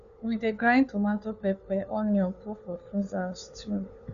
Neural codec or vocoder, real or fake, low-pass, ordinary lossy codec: codec, 16 kHz, 4 kbps, FunCodec, trained on LibriTTS, 50 frames a second; fake; 7.2 kHz; none